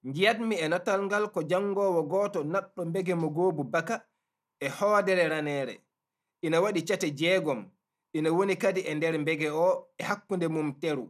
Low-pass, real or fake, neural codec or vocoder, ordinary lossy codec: 14.4 kHz; real; none; none